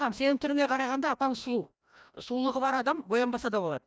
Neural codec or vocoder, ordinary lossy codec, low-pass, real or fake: codec, 16 kHz, 1 kbps, FreqCodec, larger model; none; none; fake